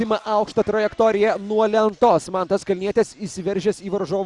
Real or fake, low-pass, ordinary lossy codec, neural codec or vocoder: real; 10.8 kHz; Opus, 64 kbps; none